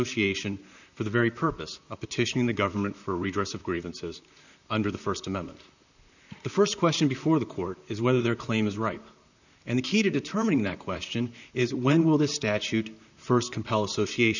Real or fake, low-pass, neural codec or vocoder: fake; 7.2 kHz; vocoder, 44.1 kHz, 128 mel bands, Pupu-Vocoder